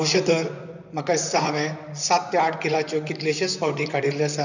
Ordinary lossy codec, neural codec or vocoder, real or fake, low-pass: none; vocoder, 44.1 kHz, 128 mel bands, Pupu-Vocoder; fake; 7.2 kHz